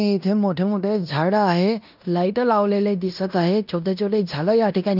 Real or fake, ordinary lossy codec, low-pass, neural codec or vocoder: fake; none; 5.4 kHz; codec, 16 kHz in and 24 kHz out, 0.9 kbps, LongCat-Audio-Codec, fine tuned four codebook decoder